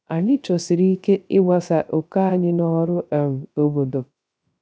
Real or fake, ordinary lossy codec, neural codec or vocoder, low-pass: fake; none; codec, 16 kHz, 0.3 kbps, FocalCodec; none